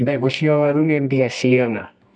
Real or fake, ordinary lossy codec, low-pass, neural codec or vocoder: fake; none; none; codec, 24 kHz, 0.9 kbps, WavTokenizer, medium music audio release